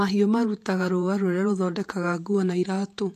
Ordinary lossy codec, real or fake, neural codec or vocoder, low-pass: AAC, 64 kbps; fake; vocoder, 48 kHz, 128 mel bands, Vocos; 14.4 kHz